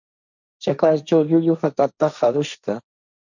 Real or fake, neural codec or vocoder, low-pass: fake; codec, 16 kHz, 1.1 kbps, Voila-Tokenizer; 7.2 kHz